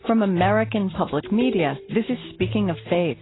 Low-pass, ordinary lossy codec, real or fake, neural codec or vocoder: 7.2 kHz; AAC, 16 kbps; real; none